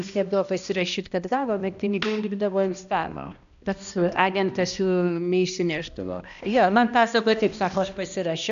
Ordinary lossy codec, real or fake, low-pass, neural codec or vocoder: AAC, 96 kbps; fake; 7.2 kHz; codec, 16 kHz, 1 kbps, X-Codec, HuBERT features, trained on balanced general audio